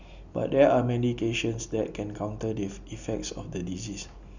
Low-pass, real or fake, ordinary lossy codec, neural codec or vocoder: 7.2 kHz; real; none; none